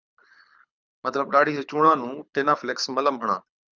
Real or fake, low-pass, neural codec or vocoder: fake; 7.2 kHz; codec, 24 kHz, 6 kbps, HILCodec